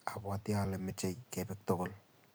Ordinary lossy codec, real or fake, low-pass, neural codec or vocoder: none; fake; none; vocoder, 44.1 kHz, 128 mel bands every 256 samples, BigVGAN v2